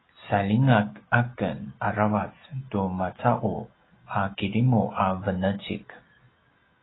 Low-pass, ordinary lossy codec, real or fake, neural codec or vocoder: 7.2 kHz; AAC, 16 kbps; real; none